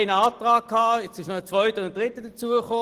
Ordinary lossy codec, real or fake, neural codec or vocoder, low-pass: Opus, 16 kbps; real; none; 14.4 kHz